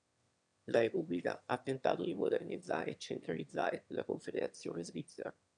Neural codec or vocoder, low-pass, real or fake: autoencoder, 22.05 kHz, a latent of 192 numbers a frame, VITS, trained on one speaker; 9.9 kHz; fake